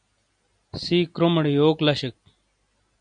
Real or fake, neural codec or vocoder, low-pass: real; none; 9.9 kHz